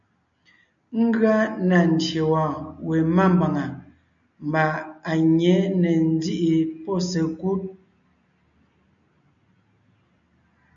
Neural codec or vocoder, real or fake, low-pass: none; real; 7.2 kHz